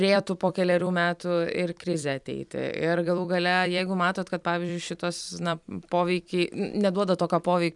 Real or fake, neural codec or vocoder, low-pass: fake; vocoder, 44.1 kHz, 128 mel bands every 256 samples, BigVGAN v2; 10.8 kHz